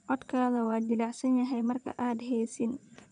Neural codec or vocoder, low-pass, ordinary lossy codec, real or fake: vocoder, 22.05 kHz, 80 mel bands, Vocos; 9.9 kHz; none; fake